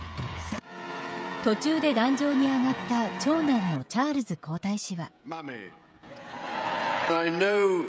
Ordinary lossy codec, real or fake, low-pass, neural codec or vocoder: none; fake; none; codec, 16 kHz, 16 kbps, FreqCodec, smaller model